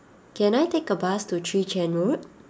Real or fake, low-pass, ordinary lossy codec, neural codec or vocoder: real; none; none; none